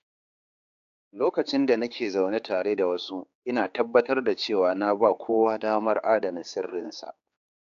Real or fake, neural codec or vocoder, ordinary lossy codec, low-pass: fake; codec, 16 kHz, 4 kbps, X-Codec, HuBERT features, trained on general audio; AAC, 64 kbps; 7.2 kHz